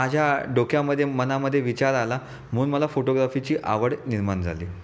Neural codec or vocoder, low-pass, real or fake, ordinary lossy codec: none; none; real; none